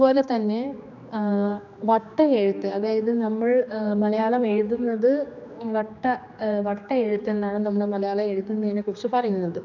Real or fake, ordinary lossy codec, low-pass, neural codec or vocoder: fake; none; 7.2 kHz; codec, 16 kHz, 2 kbps, X-Codec, HuBERT features, trained on general audio